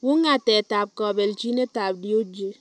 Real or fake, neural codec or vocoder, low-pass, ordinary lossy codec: real; none; none; none